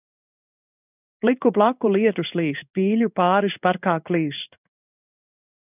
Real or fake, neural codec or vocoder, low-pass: fake; codec, 16 kHz in and 24 kHz out, 1 kbps, XY-Tokenizer; 3.6 kHz